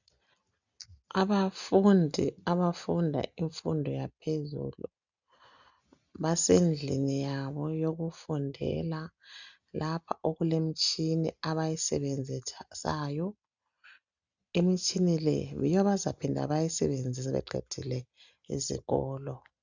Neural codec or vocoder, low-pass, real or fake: none; 7.2 kHz; real